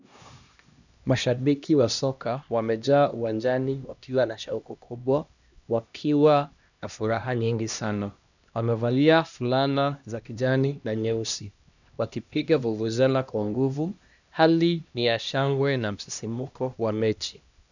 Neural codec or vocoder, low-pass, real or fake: codec, 16 kHz, 1 kbps, X-Codec, HuBERT features, trained on LibriSpeech; 7.2 kHz; fake